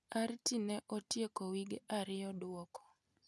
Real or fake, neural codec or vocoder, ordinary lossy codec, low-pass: real; none; none; none